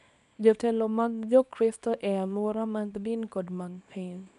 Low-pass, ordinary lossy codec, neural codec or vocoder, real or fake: 10.8 kHz; none; codec, 24 kHz, 0.9 kbps, WavTokenizer, small release; fake